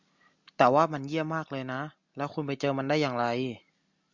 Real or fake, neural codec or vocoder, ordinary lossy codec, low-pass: real; none; Opus, 64 kbps; 7.2 kHz